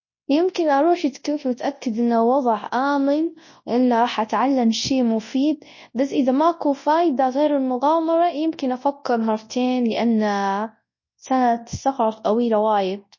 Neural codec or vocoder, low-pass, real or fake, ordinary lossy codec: codec, 24 kHz, 0.9 kbps, WavTokenizer, large speech release; 7.2 kHz; fake; MP3, 32 kbps